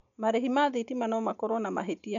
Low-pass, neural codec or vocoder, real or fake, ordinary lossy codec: 7.2 kHz; none; real; none